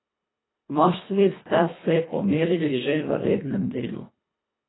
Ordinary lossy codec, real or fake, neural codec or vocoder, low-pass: AAC, 16 kbps; fake; codec, 24 kHz, 1.5 kbps, HILCodec; 7.2 kHz